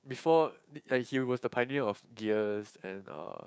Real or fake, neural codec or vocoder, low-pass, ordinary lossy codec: fake; codec, 16 kHz, 6 kbps, DAC; none; none